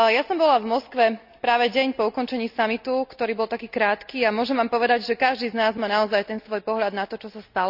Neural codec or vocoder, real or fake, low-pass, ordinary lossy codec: none; real; 5.4 kHz; none